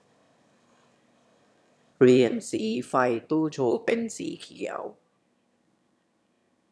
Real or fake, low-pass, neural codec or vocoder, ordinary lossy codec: fake; none; autoencoder, 22.05 kHz, a latent of 192 numbers a frame, VITS, trained on one speaker; none